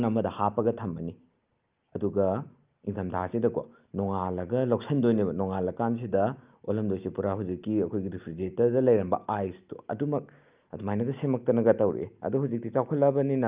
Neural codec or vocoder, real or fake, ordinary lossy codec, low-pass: none; real; Opus, 24 kbps; 3.6 kHz